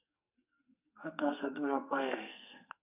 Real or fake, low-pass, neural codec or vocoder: fake; 3.6 kHz; codec, 44.1 kHz, 2.6 kbps, SNAC